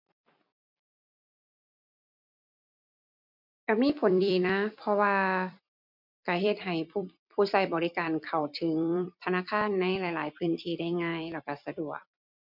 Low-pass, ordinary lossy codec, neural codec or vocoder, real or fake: 5.4 kHz; MP3, 48 kbps; vocoder, 44.1 kHz, 128 mel bands every 256 samples, BigVGAN v2; fake